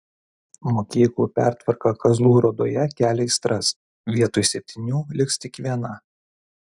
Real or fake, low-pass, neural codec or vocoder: real; 10.8 kHz; none